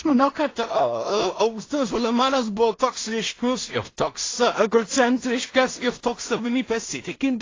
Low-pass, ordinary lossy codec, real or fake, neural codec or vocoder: 7.2 kHz; AAC, 32 kbps; fake; codec, 16 kHz in and 24 kHz out, 0.4 kbps, LongCat-Audio-Codec, two codebook decoder